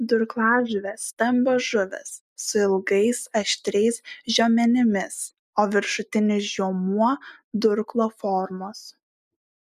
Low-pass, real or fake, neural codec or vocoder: 14.4 kHz; real; none